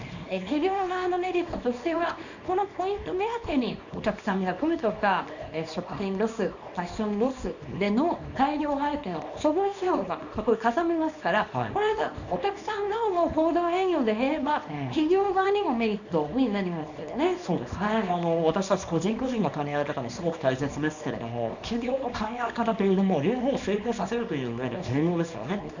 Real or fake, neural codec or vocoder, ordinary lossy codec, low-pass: fake; codec, 24 kHz, 0.9 kbps, WavTokenizer, small release; none; 7.2 kHz